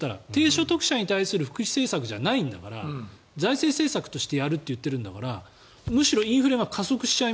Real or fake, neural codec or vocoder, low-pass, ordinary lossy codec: real; none; none; none